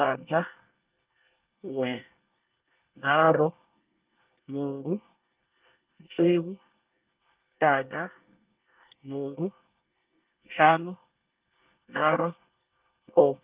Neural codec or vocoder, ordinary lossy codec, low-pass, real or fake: codec, 24 kHz, 1 kbps, SNAC; Opus, 24 kbps; 3.6 kHz; fake